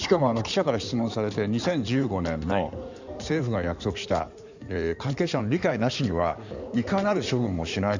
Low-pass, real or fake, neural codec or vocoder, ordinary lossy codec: 7.2 kHz; fake; codec, 16 kHz in and 24 kHz out, 2.2 kbps, FireRedTTS-2 codec; none